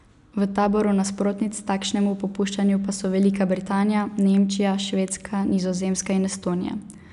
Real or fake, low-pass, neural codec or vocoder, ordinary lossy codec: real; 10.8 kHz; none; none